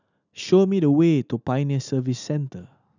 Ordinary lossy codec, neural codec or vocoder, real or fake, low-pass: none; none; real; 7.2 kHz